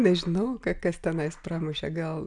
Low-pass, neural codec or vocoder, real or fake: 10.8 kHz; none; real